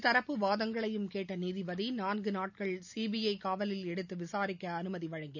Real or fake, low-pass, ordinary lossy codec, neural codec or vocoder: real; 7.2 kHz; none; none